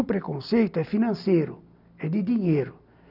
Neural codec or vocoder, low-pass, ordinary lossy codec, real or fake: none; 5.4 kHz; none; real